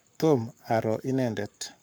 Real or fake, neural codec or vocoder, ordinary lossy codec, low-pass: fake; codec, 44.1 kHz, 7.8 kbps, Pupu-Codec; none; none